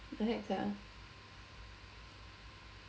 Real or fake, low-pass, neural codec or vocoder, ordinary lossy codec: real; none; none; none